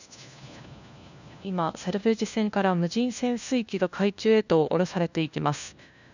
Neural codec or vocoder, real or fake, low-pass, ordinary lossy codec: codec, 16 kHz, 1 kbps, FunCodec, trained on LibriTTS, 50 frames a second; fake; 7.2 kHz; none